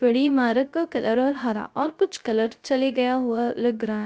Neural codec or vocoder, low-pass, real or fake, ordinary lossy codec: codec, 16 kHz, 0.3 kbps, FocalCodec; none; fake; none